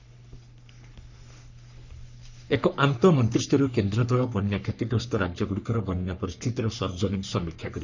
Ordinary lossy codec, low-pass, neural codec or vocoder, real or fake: none; 7.2 kHz; codec, 44.1 kHz, 3.4 kbps, Pupu-Codec; fake